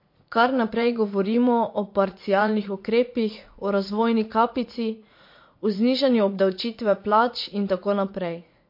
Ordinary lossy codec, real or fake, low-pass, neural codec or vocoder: MP3, 32 kbps; fake; 5.4 kHz; vocoder, 44.1 kHz, 80 mel bands, Vocos